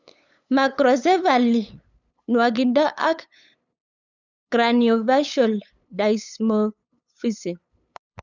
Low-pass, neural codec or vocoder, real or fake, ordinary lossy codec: 7.2 kHz; codec, 16 kHz, 8 kbps, FunCodec, trained on LibriTTS, 25 frames a second; fake; none